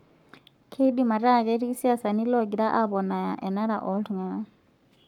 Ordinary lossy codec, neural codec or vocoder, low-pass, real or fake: none; codec, 44.1 kHz, 7.8 kbps, Pupu-Codec; 19.8 kHz; fake